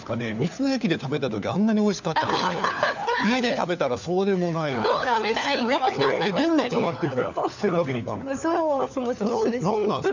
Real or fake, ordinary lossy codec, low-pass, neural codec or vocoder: fake; none; 7.2 kHz; codec, 16 kHz, 4 kbps, FunCodec, trained on LibriTTS, 50 frames a second